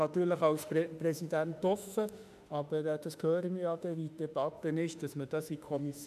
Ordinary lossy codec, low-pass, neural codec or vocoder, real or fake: none; 14.4 kHz; autoencoder, 48 kHz, 32 numbers a frame, DAC-VAE, trained on Japanese speech; fake